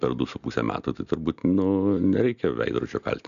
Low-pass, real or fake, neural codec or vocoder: 7.2 kHz; real; none